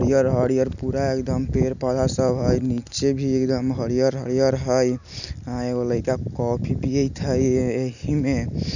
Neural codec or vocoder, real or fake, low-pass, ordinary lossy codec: none; real; 7.2 kHz; none